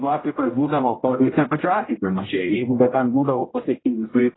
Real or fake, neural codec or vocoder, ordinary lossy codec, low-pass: fake; codec, 16 kHz, 0.5 kbps, X-Codec, HuBERT features, trained on general audio; AAC, 16 kbps; 7.2 kHz